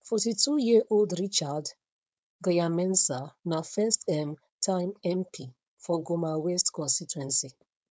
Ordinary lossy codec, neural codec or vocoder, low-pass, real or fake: none; codec, 16 kHz, 4.8 kbps, FACodec; none; fake